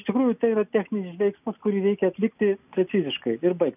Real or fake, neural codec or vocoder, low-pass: real; none; 3.6 kHz